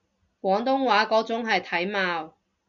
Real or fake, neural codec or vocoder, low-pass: real; none; 7.2 kHz